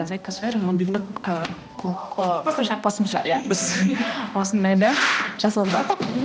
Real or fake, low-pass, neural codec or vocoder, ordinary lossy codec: fake; none; codec, 16 kHz, 1 kbps, X-Codec, HuBERT features, trained on balanced general audio; none